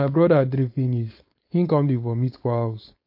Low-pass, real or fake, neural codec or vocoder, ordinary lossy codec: 5.4 kHz; fake; codec, 16 kHz, 4.8 kbps, FACodec; MP3, 32 kbps